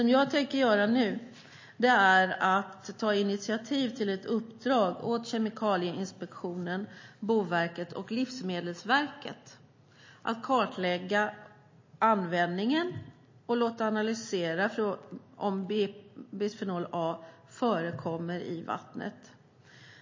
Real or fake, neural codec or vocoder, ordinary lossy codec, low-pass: real; none; MP3, 32 kbps; 7.2 kHz